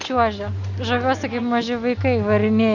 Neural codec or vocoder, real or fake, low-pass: none; real; 7.2 kHz